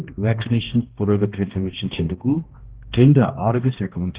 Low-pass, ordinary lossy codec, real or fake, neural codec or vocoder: 3.6 kHz; Opus, 16 kbps; fake; codec, 16 kHz, 1 kbps, X-Codec, HuBERT features, trained on balanced general audio